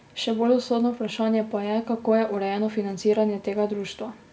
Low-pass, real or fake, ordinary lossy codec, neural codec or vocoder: none; real; none; none